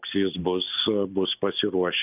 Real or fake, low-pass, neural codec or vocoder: real; 3.6 kHz; none